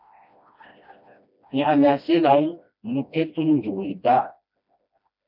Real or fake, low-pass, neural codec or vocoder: fake; 5.4 kHz; codec, 16 kHz, 1 kbps, FreqCodec, smaller model